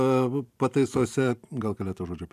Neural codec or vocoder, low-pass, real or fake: vocoder, 44.1 kHz, 128 mel bands every 512 samples, BigVGAN v2; 14.4 kHz; fake